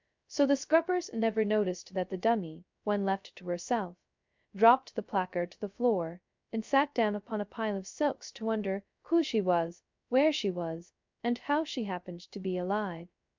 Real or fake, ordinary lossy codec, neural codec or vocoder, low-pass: fake; MP3, 64 kbps; codec, 16 kHz, 0.2 kbps, FocalCodec; 7.2 kHz